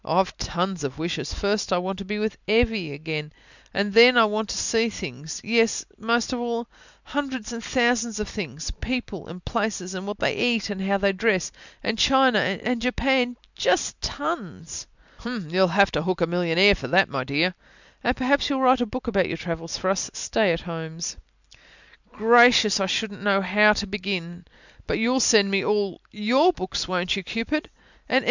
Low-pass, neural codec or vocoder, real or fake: 7.2 kHz; none; real